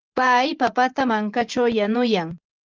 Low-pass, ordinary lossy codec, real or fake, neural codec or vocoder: 7.2 kHz; Opus, 32 kbps; fake; vocoder, 24 kHz, 100 mel bands, Vocos